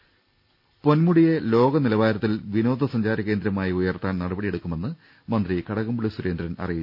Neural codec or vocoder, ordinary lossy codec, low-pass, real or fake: none; none; 5.4 kHz; real